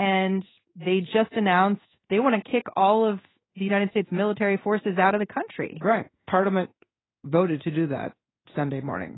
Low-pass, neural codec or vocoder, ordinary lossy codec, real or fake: 7.2 kHz; codec, 16 kHz in and 24 kHz out, 1 kbps, XY-Tokenizer; AAC, 16 kbps; fake